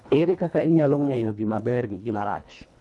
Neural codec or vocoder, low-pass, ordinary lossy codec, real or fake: codec, 24 kHz, 1.5 kbps, HILCodec; none; none; fake